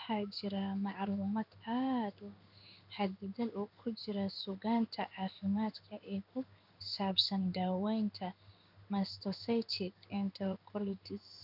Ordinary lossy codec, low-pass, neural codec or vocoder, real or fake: none; 5.4 kHz; codec, 16 kHz in and 24 kHz out, 1 kbps, XY-Tokenizer; fake